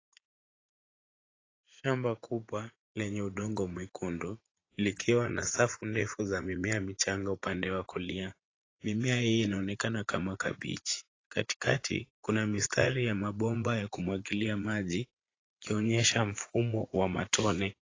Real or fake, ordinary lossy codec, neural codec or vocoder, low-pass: fake; AAC, 32 kbps; vocoder, 44.1 kHz, 80 mel bands, Vocos; 7.2 kHz